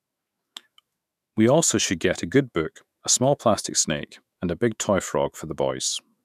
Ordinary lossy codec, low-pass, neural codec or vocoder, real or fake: none; 14.4 kHz; autoencoder, 48 kHz, 128 numbers a frame, DAC-VAE, trained on Japanese speech; fake